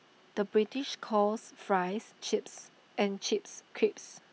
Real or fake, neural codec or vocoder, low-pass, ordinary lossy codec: real; none; none; none